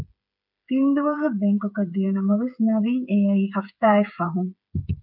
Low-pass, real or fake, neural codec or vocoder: 5.4 kHz; fake; codec, 16 kHz, 8 kbps, FreqCodec, smaller model